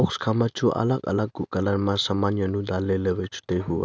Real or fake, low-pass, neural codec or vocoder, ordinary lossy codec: real; none; none; none